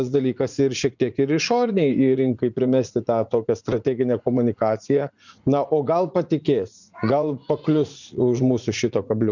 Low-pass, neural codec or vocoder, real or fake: 7.2 kHz; vocoder, 44.1 kHz, 80 mel bands, Vocos; fake